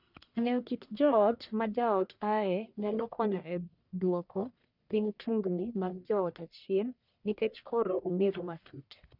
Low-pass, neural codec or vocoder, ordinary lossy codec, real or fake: 5.4 kHz; codec, 44.1 kHz, 1.7 kbps, Pupu-Codec; none; fake